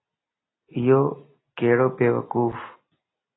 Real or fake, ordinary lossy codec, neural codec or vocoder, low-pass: real; AAC, 16 kbps; none; 7.2 kHz